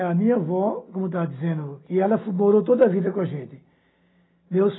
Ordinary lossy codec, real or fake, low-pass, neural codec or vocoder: AAC, 16 kbps; real; 7.2 kHz; none